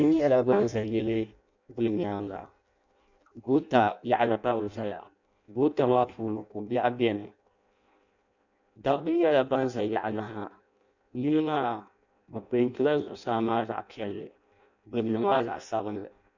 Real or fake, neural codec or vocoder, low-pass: fake; codec, 16 kHz in and 24 kHz out, 0.6 kbps, FireRedTTS-2 codec; 7.2 kHz